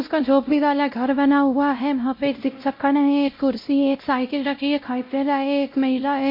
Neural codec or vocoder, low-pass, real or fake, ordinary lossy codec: codec, 16 kHz, 0.5 kbps, X-Codec, WavLM features, trained on Multilingual LibriSpeech; 5.4 kHz; fake; MP3, 32 kbps